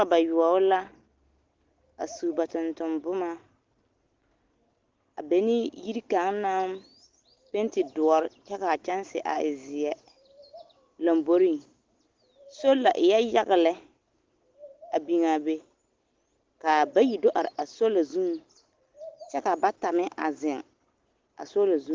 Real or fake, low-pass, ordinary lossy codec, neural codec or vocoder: real; 7.2 kHz; Opus, 16 kbps; none